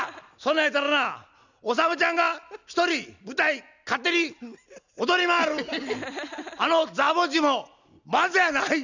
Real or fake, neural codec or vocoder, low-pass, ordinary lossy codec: real; none; 7.2 kHz; none